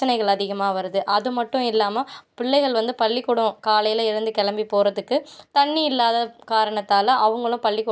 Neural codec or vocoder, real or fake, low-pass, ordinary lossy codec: none; real; none; none